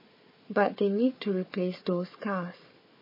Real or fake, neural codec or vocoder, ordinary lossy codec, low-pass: fake; codec, 16 kHz, 4 kbps, FunCodec, trained on Chinese and English, 50 frames a second; MP3, 24 kbps; 5.4 kHz